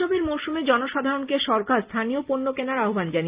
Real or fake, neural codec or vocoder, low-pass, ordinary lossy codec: real; none; 3.6 kHz; Opus, 32 kbps